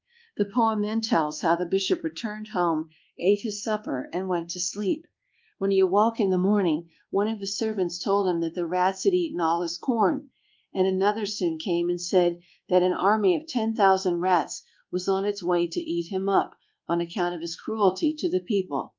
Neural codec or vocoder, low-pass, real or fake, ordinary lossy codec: codec, 24 kHz, 1.2 kbps, DualCodec; 7.2 kHz; fake; Opus, 32 kbps